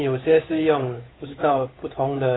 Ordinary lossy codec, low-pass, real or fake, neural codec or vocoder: AAC, 16 kbps; 7.2 kHz; fake; codec, 16 kHz, 8 kbps, FunCodec, trained on Chinese and English, 25 frames a second